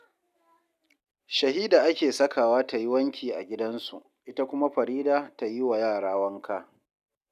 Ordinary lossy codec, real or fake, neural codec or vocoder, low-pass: none; real; none; 14.4 kHz